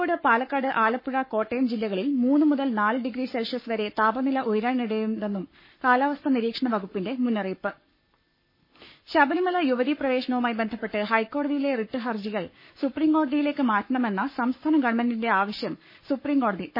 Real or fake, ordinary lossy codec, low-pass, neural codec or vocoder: fake; MP3, 24 kbps; 5.4 kHz; codec, 44.1 kHz, 7.8 kbps, Pupu-Codec